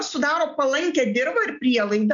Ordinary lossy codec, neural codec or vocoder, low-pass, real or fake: MP3, 96 kbps; none; 7.2 kHz; real